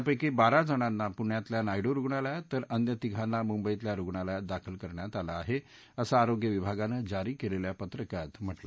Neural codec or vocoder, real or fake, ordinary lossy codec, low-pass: none; real; none; none